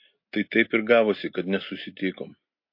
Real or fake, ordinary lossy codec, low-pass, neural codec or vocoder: real; MP3, 32 kbps; 5.4 kHz; none